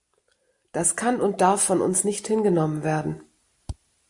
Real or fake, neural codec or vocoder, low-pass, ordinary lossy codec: real; none; 10.8 kHz; AAC, 64 kbps